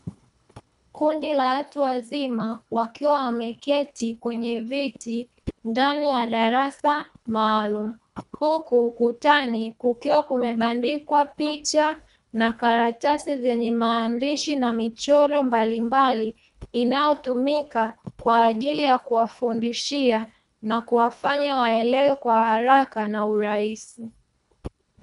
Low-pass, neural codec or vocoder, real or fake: 10.8 kHz; codec, 24 kHz, 1.5 kbps, HILCodec; fake